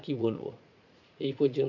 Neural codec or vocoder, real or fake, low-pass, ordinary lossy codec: vocoder, 44.1 kHz, 80 mel bands, Vocos; fake; 7.2 kHz; none